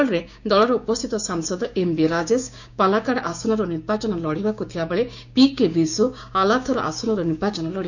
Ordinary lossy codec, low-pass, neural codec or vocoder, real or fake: none; 7.2 kHz; codec, 16 kHz, 6 kbps, DAC; fake